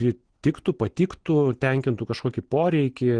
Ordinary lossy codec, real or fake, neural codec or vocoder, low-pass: Opus, 16 kbps; real; none; 9.9 kHz